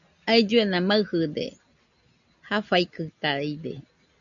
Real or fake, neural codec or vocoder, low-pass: real; none; 7.2 kHz